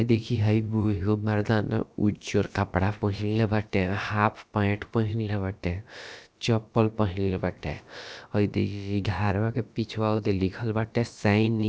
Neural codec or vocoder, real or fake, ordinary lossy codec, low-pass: codec, 16 kHz, about 1 kbps, DyCAST, with the encoder's durations; fake; none; none